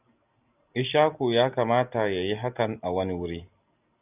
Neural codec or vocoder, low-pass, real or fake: none; 3.6 kHz; real